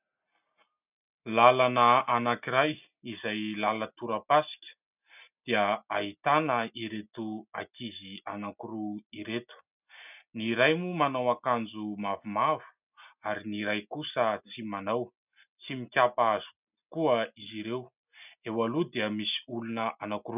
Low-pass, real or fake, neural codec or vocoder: 3.6 kHz; real; none